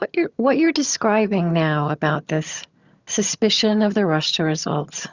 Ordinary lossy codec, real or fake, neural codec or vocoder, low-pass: Opus, 64 kbps; fake; vocoder, 22.05 kHz, 80 mel bands, HiFi-GAN; 7.2 kHz